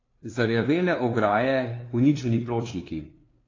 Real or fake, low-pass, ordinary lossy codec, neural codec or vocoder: fake; 7.2 kHz; AAC, 32 kbps; codec, 16 kHz, 2 kbps, FunCodec, trained on LibriTTS, 25 frames a second